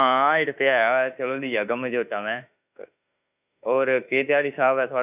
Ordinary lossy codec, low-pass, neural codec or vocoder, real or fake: none; 3.6 kHz; autoencoder, 48 kHz, 32 numbers a frame, DAC-VAE, trained on Japanese speech; fake